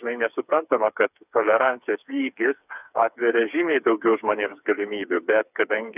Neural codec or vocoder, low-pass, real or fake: codec, 16 kHz, 4 kbps, FreqCodec, smaller model; 3.6 kHz; fake